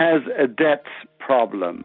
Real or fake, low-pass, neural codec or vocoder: real; 5.4 kHz; none